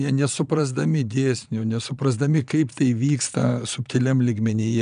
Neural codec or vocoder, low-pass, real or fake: vocoder, 22.05 kHz, 80 mel bands, Vocos; 9.9 kHz; fake